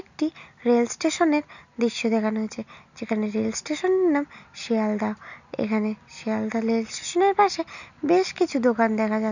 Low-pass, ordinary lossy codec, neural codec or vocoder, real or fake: 7.2 kHz; none; none; real